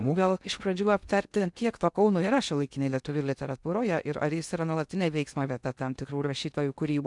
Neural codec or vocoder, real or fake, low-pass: codec, 16 kHz in and 24 kHz out, 0.6 kbps, FocalCodec, streaming, 2048 codes; fake; 10.8 kHz